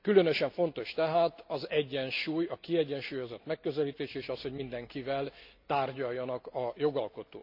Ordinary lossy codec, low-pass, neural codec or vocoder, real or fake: MP3, 48 kbps; 5.4 kHz; none; real